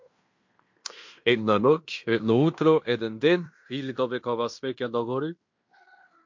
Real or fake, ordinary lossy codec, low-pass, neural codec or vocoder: fake; MP3, 48 kbps; 7.2 kHz; codec, 16 kHz in and 24 kHz out, 0.9 kbps, LongCat-Audio-Codec, fine tuned four codebook decoder